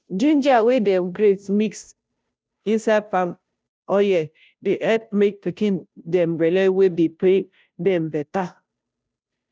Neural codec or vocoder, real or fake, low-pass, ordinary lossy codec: codec, 16 kHz, 0.5 kbps, FunCodec, trained on Chinese and English, 25 frames a second; fake; none; none